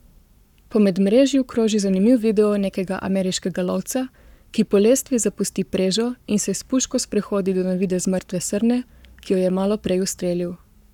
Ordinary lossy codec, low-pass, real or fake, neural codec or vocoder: none; 19.8 kHz; fake; codec, 44.1 kHz, 7.8 kbps, Pupu-Codec